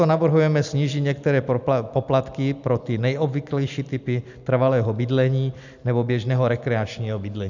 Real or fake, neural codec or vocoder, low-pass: real; none; 7.2 kHz